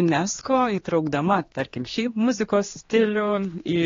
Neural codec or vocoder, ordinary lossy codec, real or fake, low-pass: codec, 16 kHz, 2 kbps, X-Codec, HuBERT features, trained on general audio; AAC, 32 kbps; fake; 7.2 kHz